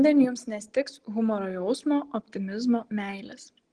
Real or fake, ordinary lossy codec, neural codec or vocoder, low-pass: real; Opus, 16 kbps; none; 9.9 kHz